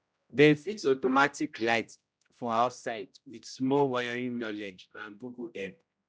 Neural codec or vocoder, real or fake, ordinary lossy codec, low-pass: codec, 16 kHz, 0.5 kbps, X-Codec, HuBERT features, trained on general audio; fake; none; none